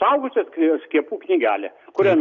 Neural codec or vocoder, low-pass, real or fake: none; 7.2 kHz; real